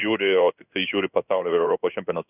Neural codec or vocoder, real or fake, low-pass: codec, 16 kHz in and 24 kHz out, 1 kbps, XY-Tokenizer; fake; 3.6 kHz